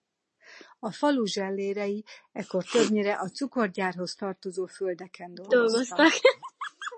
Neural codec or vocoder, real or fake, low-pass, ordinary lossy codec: none; real; 10.8 kHz; MP3, 32 kbps